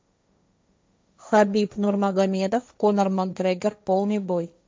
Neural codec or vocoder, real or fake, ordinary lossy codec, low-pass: codec, 16 kHz, 1.1 kbps, Voila-Tokenizer; fake; none; none